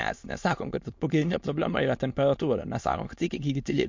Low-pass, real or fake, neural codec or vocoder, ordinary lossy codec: 7.2 kHz; fake; autoencoder, 22.05 kHz, a latent of 192 numbers a frame, VITS, trained on many speakers; MP3, 48 kbps